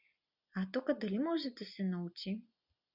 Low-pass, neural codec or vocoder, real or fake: 5.4 kHz; none; real